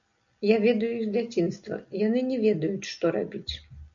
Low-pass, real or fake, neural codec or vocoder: 7.2 kHz; real; none